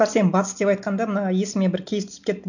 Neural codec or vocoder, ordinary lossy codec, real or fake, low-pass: none; none; real; 7.2 kHz